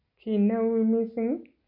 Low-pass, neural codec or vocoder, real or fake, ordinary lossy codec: 5.4 kHz; none; real; MP3, 32 kbps